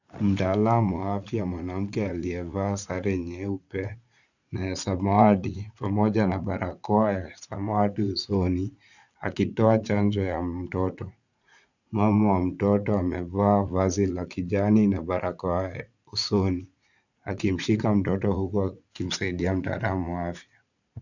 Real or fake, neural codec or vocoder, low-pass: fake; vocoder, 44.1 kHz, 80 mel bands, Vocos; 7.2 kHz